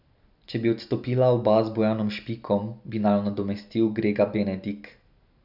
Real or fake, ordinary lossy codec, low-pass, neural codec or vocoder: real; none; 5.4 kHz; none